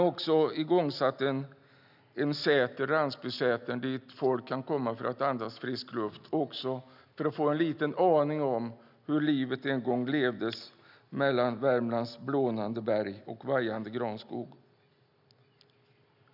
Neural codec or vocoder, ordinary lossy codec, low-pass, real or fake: none; none; 5.4 kHz; real